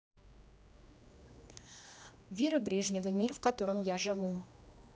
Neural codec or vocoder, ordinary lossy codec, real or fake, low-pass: codec, 16 kHz, 1 kbps, X-Codec, HuBERT features, trained on general audio; none; fake; none